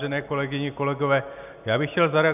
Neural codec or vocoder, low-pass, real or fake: none; 3.6 kHz; real